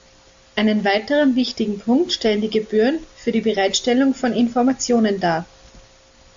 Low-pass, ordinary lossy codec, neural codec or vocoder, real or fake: 7.2 kHz; AAC, 64 kbps; none; real